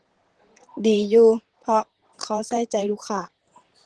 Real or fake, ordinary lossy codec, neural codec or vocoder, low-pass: fake; Opus, 16 kbps; vocoder, 44.1 kHz, 128 mel bands, Pupu-Vocoder; 10.8 kHz